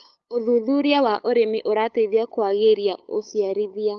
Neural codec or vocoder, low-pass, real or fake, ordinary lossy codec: codec, 16 kHz, 8 kbps, FunCodec, trained on LibriTTS, 25 frames a second; 7.2 kHz; fake; Opus, 32 kbps